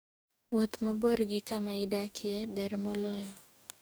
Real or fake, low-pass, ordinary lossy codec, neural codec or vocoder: fake; none; none; codec, 44.1 kHz, 2.6 kbps, DAC